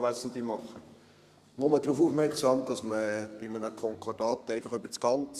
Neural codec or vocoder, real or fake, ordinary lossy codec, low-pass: codec, 32 kHz, 1.9 kbps, SNAC; fake; Opus, 64 kbps; 14.4 kHz